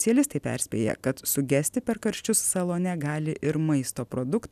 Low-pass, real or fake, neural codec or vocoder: 14.4 kHz; real; none